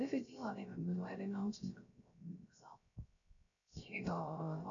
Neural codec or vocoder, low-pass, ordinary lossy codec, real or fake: codec, 16 kHz, 0.3 kbps, FocalCodec; 7.2 kHz; none; fake